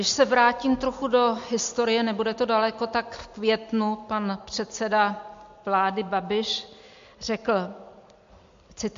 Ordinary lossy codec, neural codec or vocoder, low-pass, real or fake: MP3, 48 kbps; none; 7.2 kHz; real